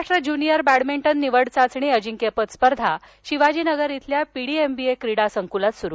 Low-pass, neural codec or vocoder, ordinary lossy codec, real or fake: none; none; none; real